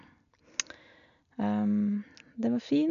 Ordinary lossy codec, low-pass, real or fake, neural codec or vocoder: none; 7.2 kHz; real; none